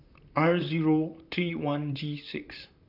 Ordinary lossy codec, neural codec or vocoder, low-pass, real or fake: none; vocoder, 44.1 kHz, 128 mel bands, Pupu-Vocoder; 5.4 kHz; fake